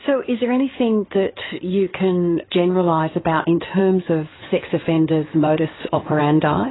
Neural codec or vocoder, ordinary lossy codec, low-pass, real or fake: codec, 16 kHz in and 24 kHz out, 2.2 kbps, FireRedTTS-2 codec; AAC, 16 kbps; 7.2 kHz; fake